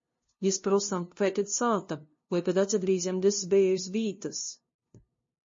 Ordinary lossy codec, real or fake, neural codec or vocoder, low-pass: MP3, 32 kbps; fake; codec, 16 kHz, 0.5 kbps, FunCodec, trained on LibriTTS, 25 frames a second; 7.2 kHz